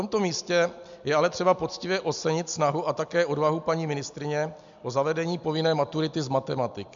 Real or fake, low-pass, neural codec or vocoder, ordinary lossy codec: real; 7.2 kHz; none; MP3, 64 kbps